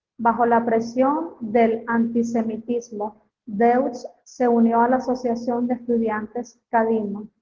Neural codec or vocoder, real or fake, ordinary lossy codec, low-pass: none; real; Opus, 16 kbps; 7.2 kHz